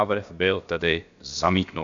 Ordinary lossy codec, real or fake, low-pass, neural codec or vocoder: AAC, 64 kbps; fake; 7.2 kHz; codec, 16 kHz, about 1 kbps, DyCAST, with the encoder's durations